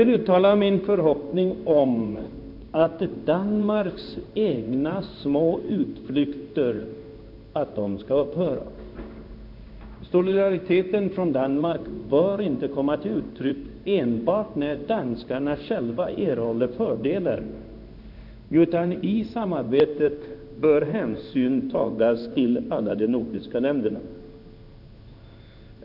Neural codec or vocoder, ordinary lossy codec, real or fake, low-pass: codec, 16 kHz in and 24 kHz out, 1 kbps, XY-Tokenizer; none; fake; 5.4 kHz